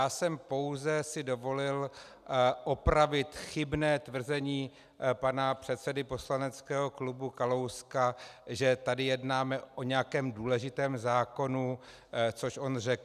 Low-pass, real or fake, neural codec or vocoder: 14.4 kHz; real; none